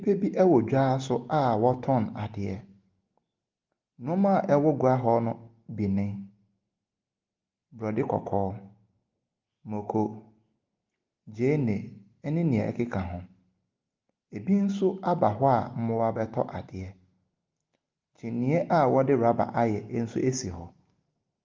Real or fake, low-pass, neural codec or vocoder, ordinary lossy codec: real; 7.2 kHz; none; Opus, 32 kbps